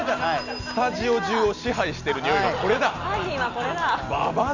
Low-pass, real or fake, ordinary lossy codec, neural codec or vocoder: 7.2 kHz; real; none; none